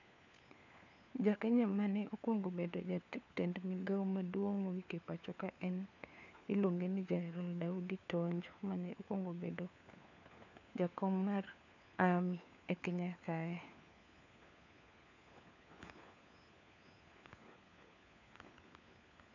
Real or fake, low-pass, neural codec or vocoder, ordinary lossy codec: fake; 7.2 kHz; codec, 16 kHz, 4 kbps, FunCodec, trained on LibriTTS, 50 frames a second; none